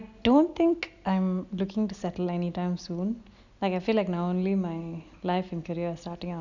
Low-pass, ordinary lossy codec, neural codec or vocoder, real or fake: 7.2 kHz; none; none; real